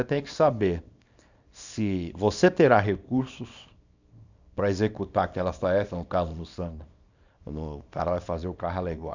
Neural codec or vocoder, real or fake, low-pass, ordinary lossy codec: codec, 24 kHz, 0.9 kbps, WavTokenizer, small release; fake; 7.2 kHz; none